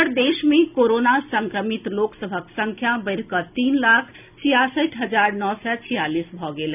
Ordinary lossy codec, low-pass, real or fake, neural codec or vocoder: none; 3.6 kHz; real; none